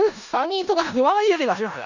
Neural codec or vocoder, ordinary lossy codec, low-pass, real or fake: codec, 16 kHz in and 24 kHz out, 0.4 kbps, LongCat-Audio-Codec, four codebook decoder; none; 7.2 kHz; fake